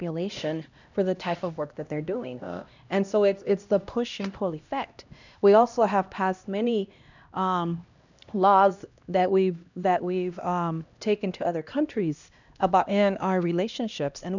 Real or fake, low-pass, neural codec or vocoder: fake; 7.2 kHz; codec, 16 kHz, 1 kbps, X-Codec, HuBERT features, trained on LibriSpeech